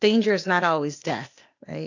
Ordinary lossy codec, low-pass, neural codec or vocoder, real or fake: AAC, 48 kbps; 7.2 kHz; codec, 16 kHz, 0.8 kbps, ZipCodec; fake